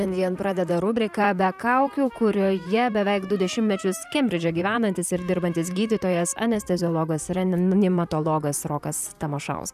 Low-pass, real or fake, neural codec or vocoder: 14.4 kHz; fake; vocoder, 44.1 kHz, 128 mel bands, Pupu-Vocoder